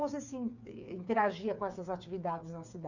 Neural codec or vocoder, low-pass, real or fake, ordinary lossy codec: codec, 16 kHz, 8 kbps, FreqCodec, smaller model; 7.2 kHz; fake; none